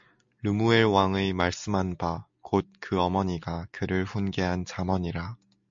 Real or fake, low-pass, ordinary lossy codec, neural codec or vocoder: real; 7.2 kHz; MP3, 48 kbps; none